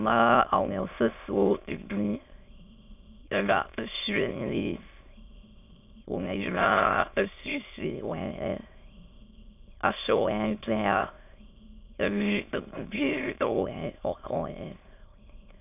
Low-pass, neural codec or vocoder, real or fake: 3.6 kHz; autoencoder, 22.05 kHz, a latent of 192 numbers a frame, VITS, trained on many speakers; fake